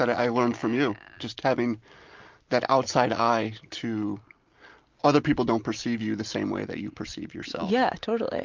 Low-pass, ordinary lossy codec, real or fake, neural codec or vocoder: 7.2 kHz; Opus, 32 kbps; real; none